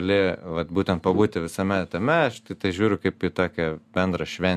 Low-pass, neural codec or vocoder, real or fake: 14.4 kHz; none; real